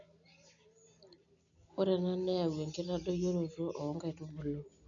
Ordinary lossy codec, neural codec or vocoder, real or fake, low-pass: none; none; real; 7.2 kHz